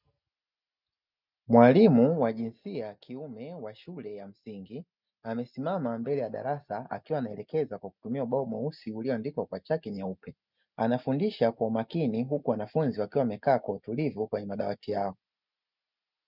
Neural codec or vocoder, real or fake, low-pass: none; real; 5.4 kHz